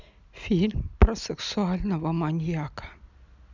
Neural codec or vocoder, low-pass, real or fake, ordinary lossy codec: none; 7.2 kHz; real; none